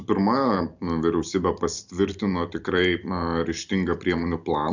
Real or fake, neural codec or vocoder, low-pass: fake; vocoder, 44.1 kHz, 128 mel bands every 256 samples, BigVGAN v2; 7.2 kHz